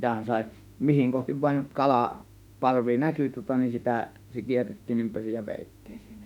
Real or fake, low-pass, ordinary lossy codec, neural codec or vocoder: fake; 19.8 kHz; none; autoencoder, 48 kHz, 32 numbers a frame, DAC-VAE, trained on Japanese speech